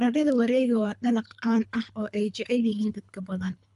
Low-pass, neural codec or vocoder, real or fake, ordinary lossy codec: 10.8 kHz; codec, 24 kHz, 3 kbps, HILCodec; fake; none